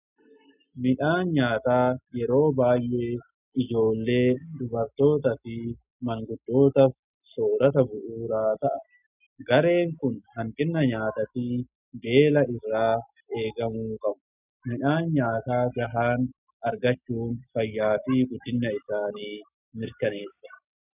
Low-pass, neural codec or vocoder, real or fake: 3.6 kHz; none; real